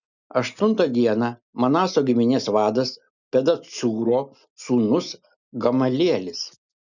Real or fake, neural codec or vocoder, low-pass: real; none; 7.2 kHz